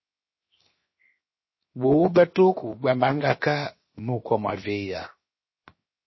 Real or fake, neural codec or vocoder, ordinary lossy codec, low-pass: fake; codec, 16 kHz, 0.7 kbps, FocalCodec; MP3, 24 kbps; 7.2 kHz